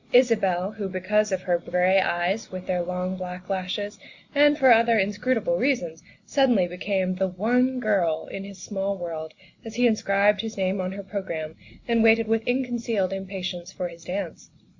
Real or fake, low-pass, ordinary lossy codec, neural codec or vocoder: real; 7.2 kHz; Opus, 64 kbps; none